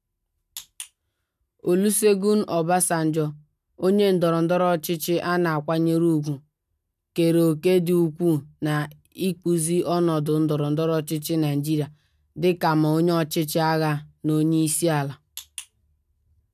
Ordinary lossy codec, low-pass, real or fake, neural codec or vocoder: none; 14.4 kHz; real; none